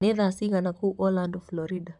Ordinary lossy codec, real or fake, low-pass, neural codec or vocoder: none; fake; 10.8 kHz; vocoder, 44.1 kHz, 128 mel bands, Pupu-Vocoder